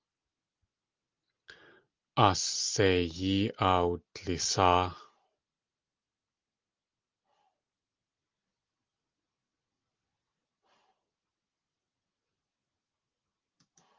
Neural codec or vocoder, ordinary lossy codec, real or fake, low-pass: none; Opus, 32 kbps; real; 7.2 kHz